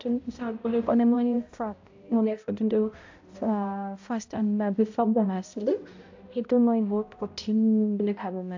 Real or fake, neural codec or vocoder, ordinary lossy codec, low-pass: fake; codec, 16 kHz, 0.5 kbps, X-Codec, HuBERT features, trained on balanced general audio; AAC, 48 kbps; 7.2 kHz